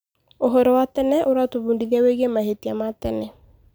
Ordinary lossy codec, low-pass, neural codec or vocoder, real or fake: none; none; none; real